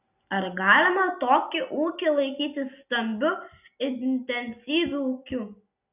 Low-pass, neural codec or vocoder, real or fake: 3.6 kHz; none; real